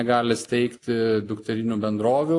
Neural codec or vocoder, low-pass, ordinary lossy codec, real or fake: none; 10.8 kHz; AAC, 48 kbps; real